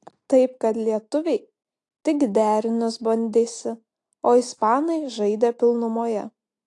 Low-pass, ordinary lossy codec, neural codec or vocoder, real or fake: 10.8 kHz; AAC, 48 kbps; none; real